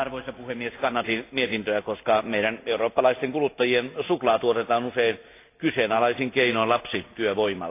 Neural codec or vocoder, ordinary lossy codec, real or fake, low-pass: none; AAC, 24 kbps; real; 3.6 kHz